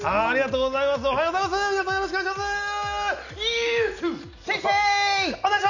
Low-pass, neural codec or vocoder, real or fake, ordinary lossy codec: 7.2 kHz; none; real; none